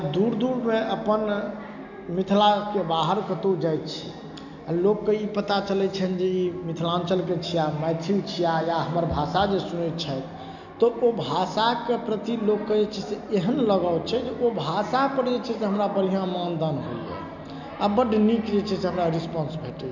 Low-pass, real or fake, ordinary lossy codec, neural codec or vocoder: 7.2 kHz; real; none; none